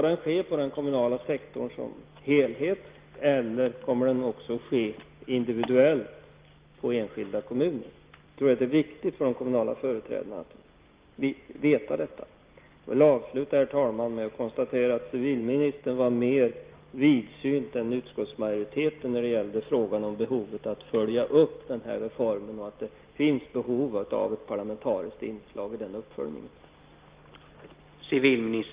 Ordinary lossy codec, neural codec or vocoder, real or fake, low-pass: Opus, 32 kbps; none; real; 3.6 kHz